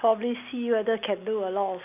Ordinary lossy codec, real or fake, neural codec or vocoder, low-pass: AAC, 32 kbps; real; none; 3.6 kHz